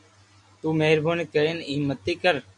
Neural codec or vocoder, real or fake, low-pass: none; real; 10.8 kHz